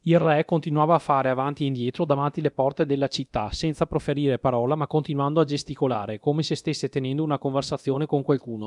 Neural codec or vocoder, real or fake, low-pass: codec, 24 kHz, 0.9 kbps, DualCodec; fake; 10.8 kHz